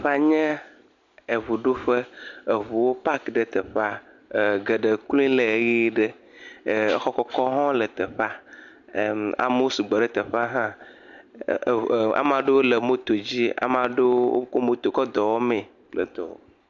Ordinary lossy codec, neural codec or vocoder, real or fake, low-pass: MP3, 64 kbps; none; real; 7.2 kHz